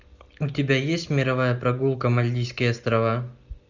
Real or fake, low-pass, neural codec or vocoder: real; 7.2 kHz; none